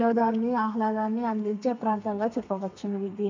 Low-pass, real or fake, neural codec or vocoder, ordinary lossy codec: 7.2 kHz; fake; codec, 32 kHz, 1.9 kbps, SNAC; MP3, 64 kbps